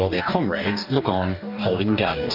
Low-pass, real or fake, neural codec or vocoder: 5.4 kHz; fake; codec, 44.1 kHz, 2.6 kbps, DAC